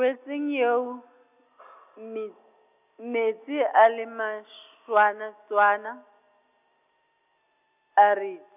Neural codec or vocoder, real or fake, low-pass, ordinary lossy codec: none; real; 3.6 kHz; none